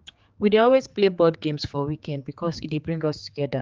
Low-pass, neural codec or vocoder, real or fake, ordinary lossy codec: 7.2 kHz; codec, 16 kHz, 4 kbps, X-Codec, HuBERT features, trained on general audio; fake; Opus, 24 kbps